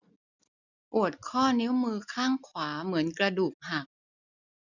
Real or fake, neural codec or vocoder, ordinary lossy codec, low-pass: real; none; none; 7.2 kHz